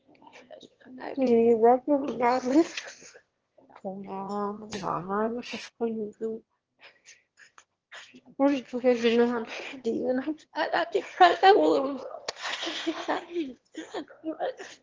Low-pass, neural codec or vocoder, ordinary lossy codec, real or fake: 7.2 kHz; autoencoder, 22.05 kHz, a latent of 192 numbers a frame, VITS, trained on one speaker; Opus, 16 kbps; fake